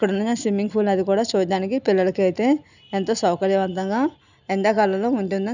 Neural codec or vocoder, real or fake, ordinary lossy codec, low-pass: none; real; none; 7.2 kHz